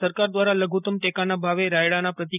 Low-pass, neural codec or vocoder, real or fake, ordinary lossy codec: 3.6 kHz; none; real; none